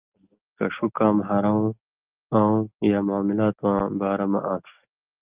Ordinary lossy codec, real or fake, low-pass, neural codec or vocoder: Opus, 32 kbps; real; 3.6 kHz; none